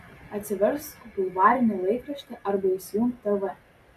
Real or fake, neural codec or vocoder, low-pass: real; none; 14.4 kHz